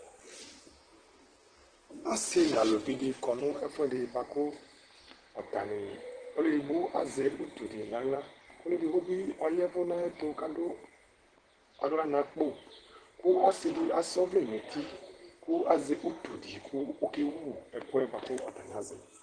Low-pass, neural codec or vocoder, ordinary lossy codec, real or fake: 9.9 kHz; vocoder, 44.1 kHz, 128 mel bands, Pupu-Vocoder; Opus, 16 kbps; fake